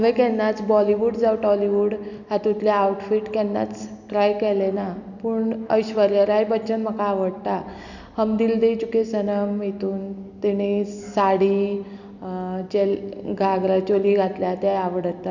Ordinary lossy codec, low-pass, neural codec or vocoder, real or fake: none; 7.2 kHz; none; real